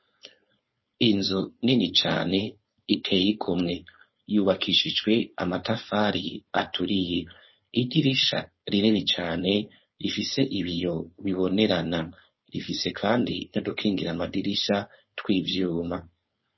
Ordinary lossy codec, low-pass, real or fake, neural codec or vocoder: MP3, 24 kbps; 7.2 kHz; fake; codec, 16 kHz, 4.8 kbps, FACodec